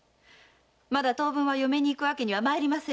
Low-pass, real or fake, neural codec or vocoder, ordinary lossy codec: none; real; none; none